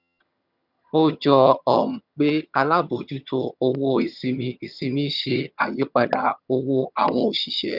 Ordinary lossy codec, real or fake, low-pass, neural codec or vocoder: none; fake; 5.4 kHz; vocoder, 22.05 kHz, 80 mel bands, HiFi-GAN